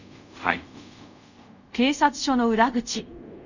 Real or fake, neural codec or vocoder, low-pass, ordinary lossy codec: fake; codec, 24 kHz, 0.5 kbps, DualCodec; 7.2 kHz; none